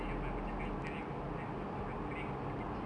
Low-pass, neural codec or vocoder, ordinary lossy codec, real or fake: 9.9 kHz; none; none; real